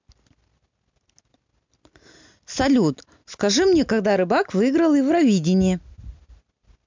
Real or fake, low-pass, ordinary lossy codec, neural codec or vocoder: real; 7.2 kHz; MP3, 64 kbps; none